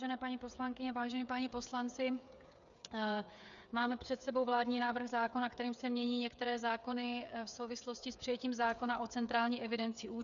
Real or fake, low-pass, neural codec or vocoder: fake; 7.2 kHz; codec, 16 kHz, 8 kbps, FreqCodec, smaller model